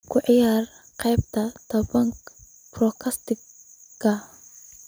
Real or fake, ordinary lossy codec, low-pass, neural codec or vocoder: real; none; none; none